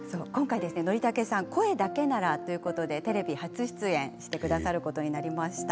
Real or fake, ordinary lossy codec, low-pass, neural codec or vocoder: real; none; none; none